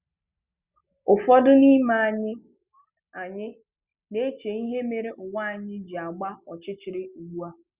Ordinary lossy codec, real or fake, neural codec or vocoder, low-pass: Opus, 64 kbps; real; none; 3.6 kHz